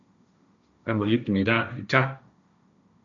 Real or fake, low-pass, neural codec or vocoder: fake; 7.2 kHz; codec, 16 kHz, 1.1 kbps, Voila-Tokenizer